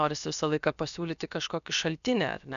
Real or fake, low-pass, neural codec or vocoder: fake; 7.2 kHz; codec, 16 kHz, about 1 kbps, DyCAST, with the encoder's durations